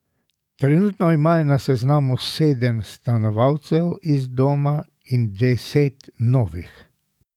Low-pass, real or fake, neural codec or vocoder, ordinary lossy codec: 19.8 kHz; fake; codec, 44.1 kHz, 7.8 kbps, DAC; none